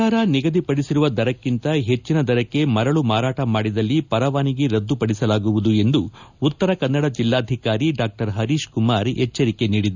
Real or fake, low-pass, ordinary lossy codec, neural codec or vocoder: real; 7.2 kHz; none; none